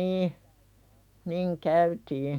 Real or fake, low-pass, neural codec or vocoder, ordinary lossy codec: fake; 19.8 kHz; autoencoder, 48 kHz, 128 numbers a frame, DAC-VAE, trained on Japanese speech; MP3, 96 kbps